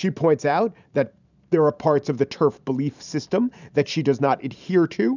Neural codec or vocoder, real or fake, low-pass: vocoder, 44.1 kHz, 128 mel bands every 512 samples, BigVGAN v2; fake; 7.2 kHz